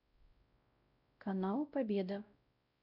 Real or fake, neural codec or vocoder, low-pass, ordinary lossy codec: fake; codec, 16 kHz, 0.5 kbps, X-Codec, WavLM features, trained on Multilingual LibriSpeech; 5.4 kHz; none